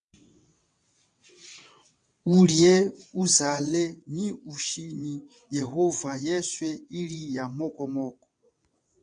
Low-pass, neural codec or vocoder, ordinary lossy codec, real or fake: 9.9 kHz; vocoder, 22.05 kHz, 80 mel bands, WaveNeXt; AAC, 64 kbps; fake